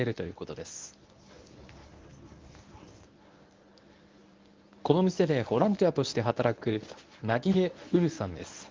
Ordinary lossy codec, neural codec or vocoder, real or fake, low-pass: Opus, 24 kbps; codec, 24 kHz, 0.9 kbps, WavTokenizer, medium speech release version 1; fake; 7.2 kHz